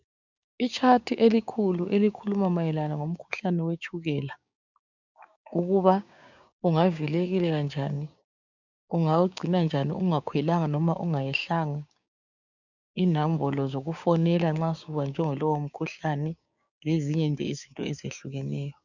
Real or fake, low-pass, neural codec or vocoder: fake; 7.2 kHz; codec, 16 kHz, 6 kbps, DAC